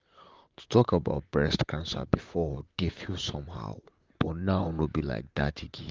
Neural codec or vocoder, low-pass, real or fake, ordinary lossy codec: vocoder, 44.1 kHz, 128 mel bands, Pupu-Vocoder; 7.2 kHz; fake; Opus, 32 kbps